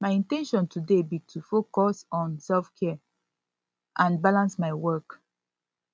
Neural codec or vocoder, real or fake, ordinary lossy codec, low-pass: none; real; none; none